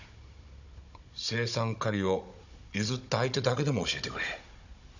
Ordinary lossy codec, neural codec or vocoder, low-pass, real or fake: none; codec, 16 kHz, 16 kbps, FunCodec, trained on Chinese and English, 50 frames a second; 7.2 kHz; fake